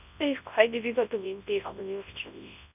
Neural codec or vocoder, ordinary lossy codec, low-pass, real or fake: codec, 24 kHz, 0.9 kbps, WavTokenizer, large speech release; none; 3.6 kHz; fake